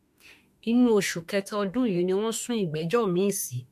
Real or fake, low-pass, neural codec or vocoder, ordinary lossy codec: fake; 14.4 kHz; autoencoder, 48 kHz, 32 numbers a frame, DAC-VAE, trained on Japanese speech; MP3, 64 kbps